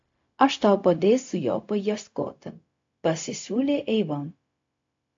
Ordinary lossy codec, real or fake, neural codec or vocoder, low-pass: AAC, 48 kbps; fake; codec, 16 kHz, 0.4 kbps, LongCat-Audio-Codec; 7.2 kHz